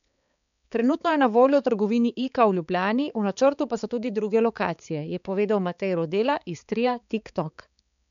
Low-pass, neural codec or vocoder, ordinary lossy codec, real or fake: 7.2 kHz; codec, 16 kHz, 4 kbps, X-Codec, HuBERT features, trained on balanced general audio; none; fake